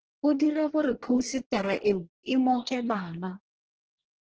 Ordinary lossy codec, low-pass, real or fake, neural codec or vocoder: Opus, 16 kbps; 7.2 kHz; fake; codec, 24 kHz, 1 kbps, SNAC